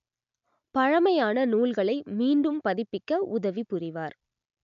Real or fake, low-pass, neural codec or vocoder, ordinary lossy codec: real; 7.2 kHz; none; none